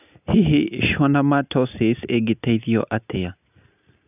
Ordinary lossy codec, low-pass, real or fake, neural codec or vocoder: none; 3.6 kHz; real; none